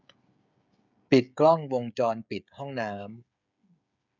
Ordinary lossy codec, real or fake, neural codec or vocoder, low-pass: none; fake; codec, 16 kHz, 16 kbps, FreqCodec, smaller model; 7.2 kHz